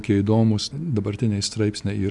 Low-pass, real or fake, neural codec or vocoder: 10.8 kHz; real; none